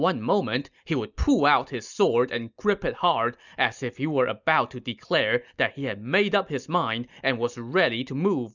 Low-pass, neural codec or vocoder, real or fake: 7.2 kHz; none; real